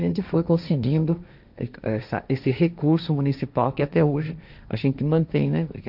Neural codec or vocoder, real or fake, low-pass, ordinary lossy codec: codec, 16 kHz, 1.1 kbps, Voila-Tokenizer; fake; 5.4 kHz; none